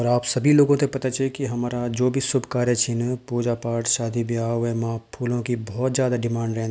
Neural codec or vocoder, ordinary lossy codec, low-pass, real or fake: none; none; none; real